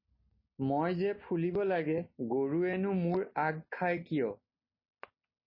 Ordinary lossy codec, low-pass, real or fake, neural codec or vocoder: MP3, 24 kbps; 5.4 kHz; real; none